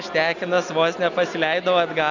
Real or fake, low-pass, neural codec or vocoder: real; 7.2 kHz; none